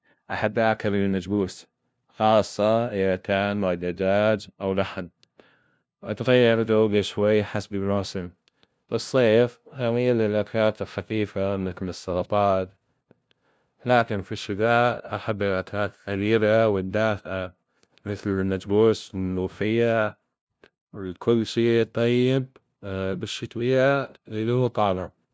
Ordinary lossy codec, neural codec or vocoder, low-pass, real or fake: none; codec, 16 kHz, 0.5 kbps, FunCodec, trained on LibriTTS, 25 frames a second; none; fake